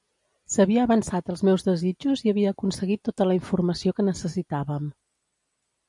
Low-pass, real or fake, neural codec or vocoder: 10.8 kHz; real; none